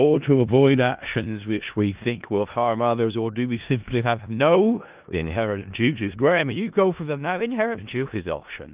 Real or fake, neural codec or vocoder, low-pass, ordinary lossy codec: fake; codec, 16 kHz in and 24 kHz out, 0.4 kbps, LongCat-Audio-Codec, four codebook decoder; 3.6 kHz; Opus, 32 kbps